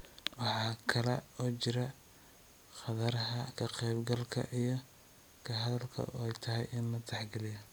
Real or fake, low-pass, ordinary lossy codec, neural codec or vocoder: real; none; none; none